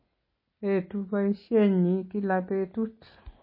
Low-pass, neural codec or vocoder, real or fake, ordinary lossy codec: 5.4 kHz; none; real; MP3, 24 kbps